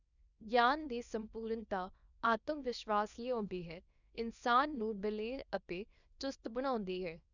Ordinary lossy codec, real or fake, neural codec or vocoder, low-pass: none; fake; codec, 24 kHz, 0.9 kbps, WavTokenizer, small release; 7.2 kHz